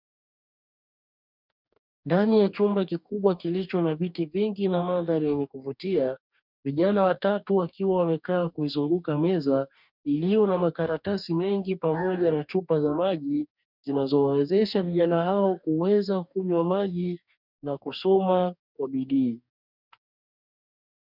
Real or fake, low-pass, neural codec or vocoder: fake; 5.4 kHz; codec, 44.1 kHz, 2.6 kbps, DAC